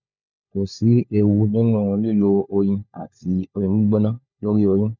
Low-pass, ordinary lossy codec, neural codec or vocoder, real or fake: 7.2 kHz; none; codec, 16 kHz, 4 kbps, FunCodec, trained on LibriTTS, 50 frames a second; fake